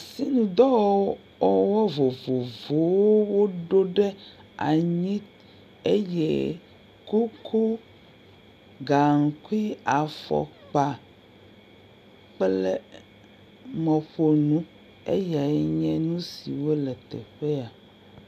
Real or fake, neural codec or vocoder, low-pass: real; none; 14.4 kHz